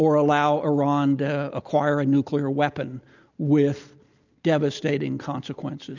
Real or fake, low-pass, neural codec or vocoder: real; 7.2 kHz; none